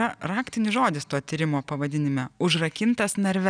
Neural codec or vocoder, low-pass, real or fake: none; 9.9 kHz; real